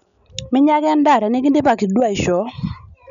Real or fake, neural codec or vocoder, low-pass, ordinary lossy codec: real; none; 7.2 kHz; none